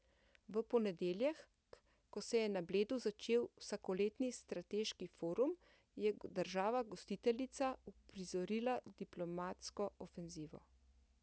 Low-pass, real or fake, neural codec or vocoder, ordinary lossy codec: none; real; none; none